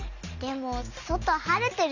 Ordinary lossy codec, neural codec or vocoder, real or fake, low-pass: none; none; real; 7.2 kHz